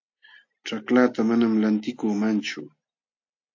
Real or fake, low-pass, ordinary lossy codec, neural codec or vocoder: real; 7.2 kHz; AAC, 48 kbps; none